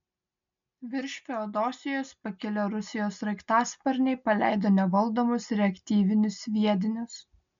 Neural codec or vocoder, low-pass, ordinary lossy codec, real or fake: none; 7.2 kHz; MP3, 64 kbps; real